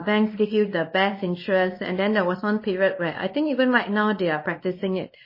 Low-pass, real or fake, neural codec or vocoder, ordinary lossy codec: 5.4 kHz; fake; codec, 24 kHz, 0.9 kbps, WavTokenizer, small release; MP3, 24 kbps